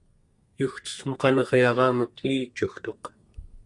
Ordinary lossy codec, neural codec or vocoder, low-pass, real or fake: Opus, 64 kbps; codec, 32 kHz, 1.9 kbps, SNAC; 10.8 kHz; fake